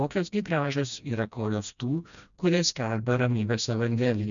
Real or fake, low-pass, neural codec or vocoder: fake; 7.2 kHz; codec, 16 kHz, 1 kbps, FreqCodec, smaller model